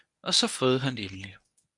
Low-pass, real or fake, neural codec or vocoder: 10.8 kHz; fake; codec, 24 kHz, 0.9 kbps, WavTokenizer, medium speech release version 1